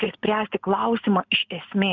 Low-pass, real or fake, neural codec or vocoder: 7.2 kHz; real; none